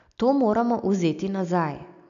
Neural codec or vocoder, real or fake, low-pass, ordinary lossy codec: none; real; 7.2 kHz; none